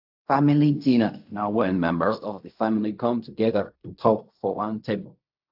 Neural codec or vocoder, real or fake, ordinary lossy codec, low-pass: codec, 16 kHz in and 24 kHz out, 0.4 kbps, LongCat-Audio-Codec, fine tuned four codebook decoder; fake; AAC, 48 kbps; 5.4 kHz